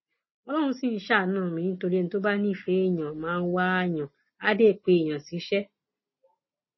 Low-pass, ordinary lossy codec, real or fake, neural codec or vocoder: 7.2 kHz; MP3, 24 kbps; real; none